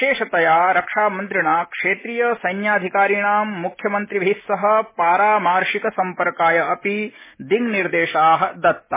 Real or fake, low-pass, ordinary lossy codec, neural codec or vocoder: real; 3.6 kHz; MP3, 16 kbps; none